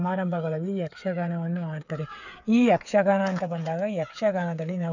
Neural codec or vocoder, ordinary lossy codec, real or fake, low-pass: codec, 16 kHz, 8 kbps, FreqCodec, smaller model; none; fake; 7.2 kHz